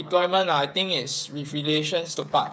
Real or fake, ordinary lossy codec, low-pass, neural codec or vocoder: fake; none; none; codec, 16 kHz, 8 kbps, FreqCodec, smaller model